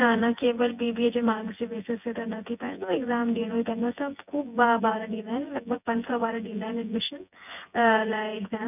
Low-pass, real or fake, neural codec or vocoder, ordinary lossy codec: 3.6 kHz; fake; vocoder, 24 kHz, 100 mel bands, Vocos; none